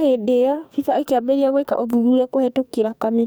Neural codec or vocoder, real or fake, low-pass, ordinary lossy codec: codec, 44.1 kHz, 2.6 kbps, SNAC; fake; none; none